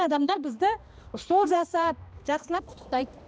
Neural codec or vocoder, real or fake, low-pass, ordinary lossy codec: codec, 16 kHz, 1 kbps, X-Codec, HuBERT features, trained on balanced general audio; fake; none; none